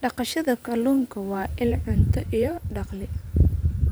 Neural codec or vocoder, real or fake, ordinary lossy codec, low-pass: vocoder, 44.1 kHz, 128 mel bands every 512 samples, BigVGAN v2; fake; none; none